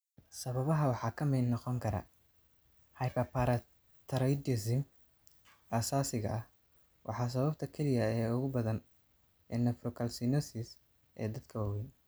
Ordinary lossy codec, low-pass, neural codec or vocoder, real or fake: none; none; none; real